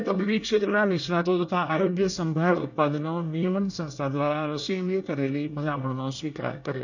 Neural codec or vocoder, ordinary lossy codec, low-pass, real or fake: codec, 24 kHz, 1 kbps, SNAC; none; 7.2 kHz; fake